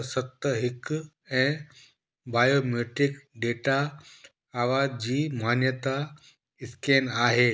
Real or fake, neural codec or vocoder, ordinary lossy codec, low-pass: real; none; none; none